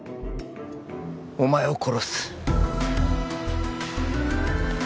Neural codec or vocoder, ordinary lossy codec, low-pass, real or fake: none; none; none; real